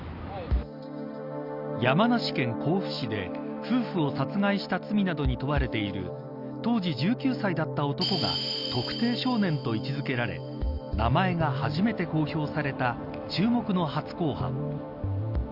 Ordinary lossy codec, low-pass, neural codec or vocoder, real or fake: Opus, 64 kbps; 5.4 kHz; none; real